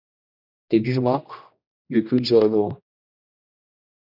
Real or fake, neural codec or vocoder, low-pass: fake; codec, 16 kHz, 1 kbps, X-Codec, HuBERT features, trained on general audio; 5.4 kHz